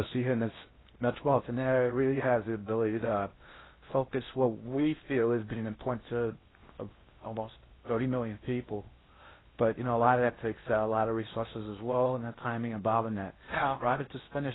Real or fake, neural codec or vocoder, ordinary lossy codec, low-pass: fake; codec, 16 kHz in and 24 kHz out, 0.6 kbps, FocalCodec, streaming, 4096 codes; AAC, 16 kbps; 7.2 kHz